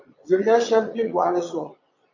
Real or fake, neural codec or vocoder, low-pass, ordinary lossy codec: fake; vocoder, 22.05 kHz, 80 mel bands, Vocos; 7.2 kHz; AAC, 48 kbps